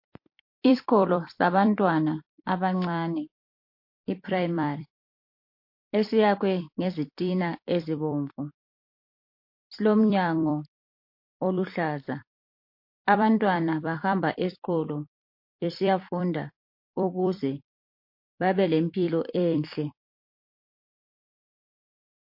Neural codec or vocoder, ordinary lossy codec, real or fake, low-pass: vocoder, 44.1 kHz, 128 mel bands every 256 samples, BigVGAN v2; MP3, 32 kbps; fake; 5.4 kHz